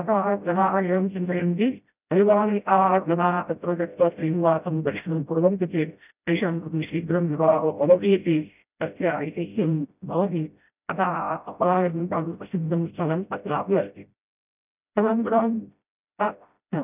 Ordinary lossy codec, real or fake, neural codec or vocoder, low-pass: none; fake; codec, 16 kHz, 0.5 kbps, FreqCodec, smaller model; 3.6 kHz